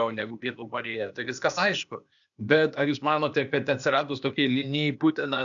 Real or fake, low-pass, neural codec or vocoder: fake; 7.2 kHz; codec, 16 kHz, 0.8 kbps, ZipCodec